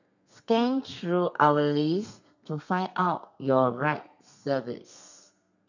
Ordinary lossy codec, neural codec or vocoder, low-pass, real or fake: none; codec, 32 kHz, 1.9 kbps, SNAC; 7.2 kHz; fake